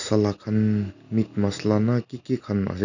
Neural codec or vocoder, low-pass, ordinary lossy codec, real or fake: none; 7.2 kHz; none; real